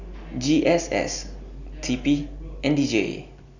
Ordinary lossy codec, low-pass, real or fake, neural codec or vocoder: none; 7.2 kHz; real; none